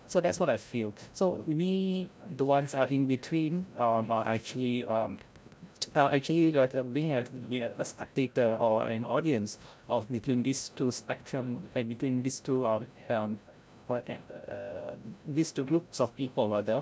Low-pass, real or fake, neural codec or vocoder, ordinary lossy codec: none; fake; codec, 16 kHz, 0.5 kbps, FreqCodec, larger model; none